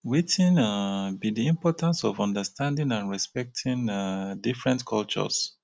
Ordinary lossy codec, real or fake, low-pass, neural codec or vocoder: none; real; none; none